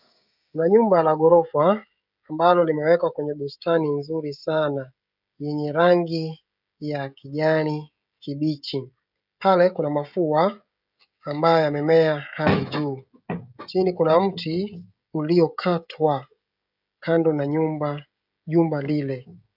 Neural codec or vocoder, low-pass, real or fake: codec, 16 kHz, 16 kbps, FreqCodec, smaller model; 5.4 kHz; fake